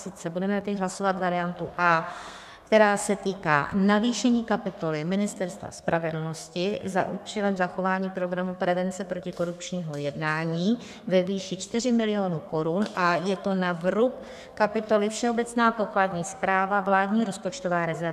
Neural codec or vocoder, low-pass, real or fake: codec, 32 kHz, 1.9 kbps, SNAC; 14.4 kHz; fake